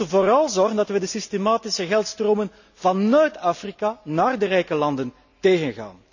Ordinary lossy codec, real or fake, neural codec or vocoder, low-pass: none; real; none; 7.2 kHz